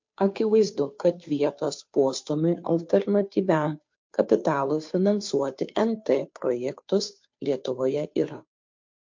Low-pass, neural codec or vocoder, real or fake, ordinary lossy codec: 7.2 kHz; codec, 16 kHz, 2 kbps, FunCodec, trained on Chinese and English, 25 frames a second; fake; MP3, 48 kbps